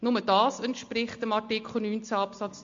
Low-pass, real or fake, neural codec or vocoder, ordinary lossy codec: 7.2 kHz; real; none; MP3, 48 kbps